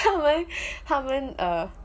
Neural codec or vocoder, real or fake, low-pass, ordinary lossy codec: none; real; none; none